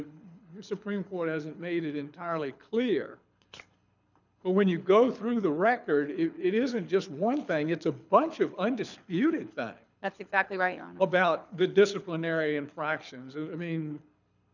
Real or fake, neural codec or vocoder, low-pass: fake; codec, 24 kHz, 6 kbps, HILCodec; 7.2 kHz